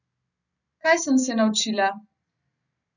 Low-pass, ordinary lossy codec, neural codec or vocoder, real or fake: 7.2 kHz; none; none; real